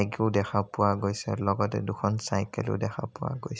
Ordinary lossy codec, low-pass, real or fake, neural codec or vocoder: none; none; real; none